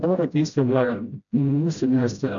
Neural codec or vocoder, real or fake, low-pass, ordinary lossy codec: codec, 16 kHz, 0.5 kbps, FreqCodec, smaller model; fake; 7.2 kHz; MP3, 96 kbps